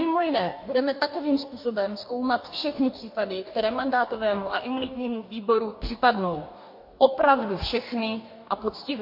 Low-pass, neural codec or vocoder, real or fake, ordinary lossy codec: 5.4 kHz; codec, 44.1 kHz, 2.6 kbps, DAC; fake; MP3, 32 kbps